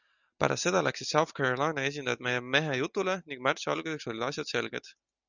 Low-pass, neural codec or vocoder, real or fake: 7.2 kHz; none; real